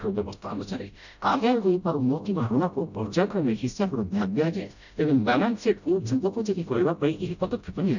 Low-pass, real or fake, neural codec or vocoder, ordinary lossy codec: 7.2 kHz; fake; codec, 16 kHz, 0.5 kbps, FreqCodec, smaller model; none